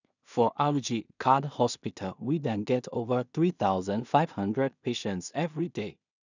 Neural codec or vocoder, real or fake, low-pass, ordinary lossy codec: codec, 16 kHz in and 24 kHz out, 0.4 kbps, LongCat-Audio-Codec, two codebook decoder; fake; 7.2 kHz; none